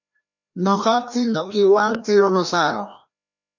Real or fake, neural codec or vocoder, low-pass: fake; codec, 16 kHz, 1 kbps, FreqCodec, larger model; 7.2 kHz